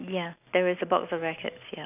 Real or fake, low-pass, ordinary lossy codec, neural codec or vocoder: real; 3.6 kHz; none; none